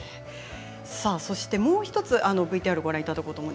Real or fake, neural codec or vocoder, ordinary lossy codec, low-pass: real; none; none; none